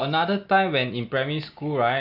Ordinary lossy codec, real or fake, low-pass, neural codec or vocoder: none; real; 5.4 kHz; none